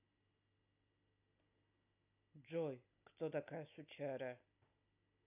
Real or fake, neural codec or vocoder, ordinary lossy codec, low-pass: real; none; none; 3.6 kHz